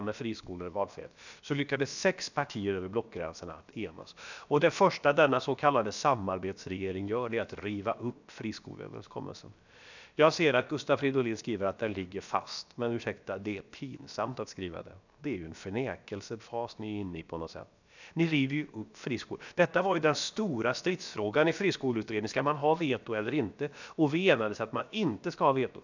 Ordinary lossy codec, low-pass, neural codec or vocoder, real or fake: none; 7.2 kHz; codec, 16 kHz, about 1 kbps, DyCAST, with the encoder's durations; fake